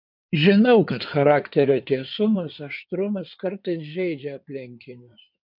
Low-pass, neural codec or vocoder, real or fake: 5.4 kHz; codec, 16 kHz in and 24 kHz out, 2.2 kbps, FireRedTTS-2 codec; fake